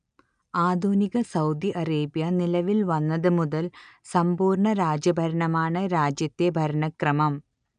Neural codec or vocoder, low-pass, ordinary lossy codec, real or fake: none; 9.9 kHz; none; real